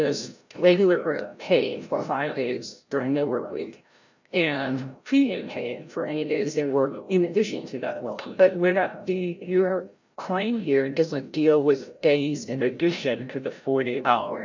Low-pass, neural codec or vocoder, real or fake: 7.2 kHz; codec, 16 kHz, 0.5 kbps, FreqCodec, larger model; fake